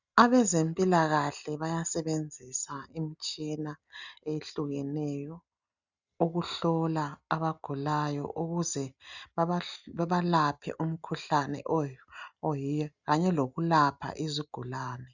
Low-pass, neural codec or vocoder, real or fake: 7.2 kHz; none; real